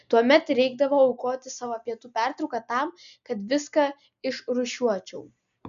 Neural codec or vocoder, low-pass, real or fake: none; 7.2 kHz; real